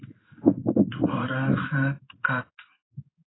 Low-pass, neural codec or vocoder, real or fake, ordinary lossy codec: 7.2 kHz; codec, 16 kHz in and 24 kHz out, 1 kbps, XY-Tokenizer; fake; AAC, 16 kbps